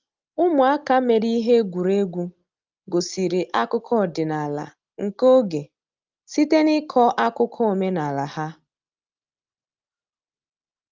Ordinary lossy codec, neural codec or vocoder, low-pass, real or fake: Opus, 24 kbps; none; 7.2 kHz; real